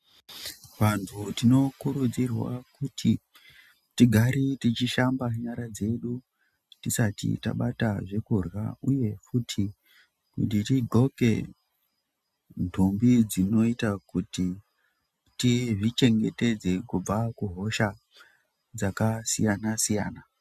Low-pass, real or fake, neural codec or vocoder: 14.4 kHz; real; none